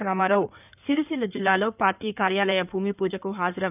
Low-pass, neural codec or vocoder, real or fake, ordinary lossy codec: 3.6 kHz; codec, 16 kHz in and 24 kHz out, 2.2 kbps, FireRedTTS-2 codec; fake; none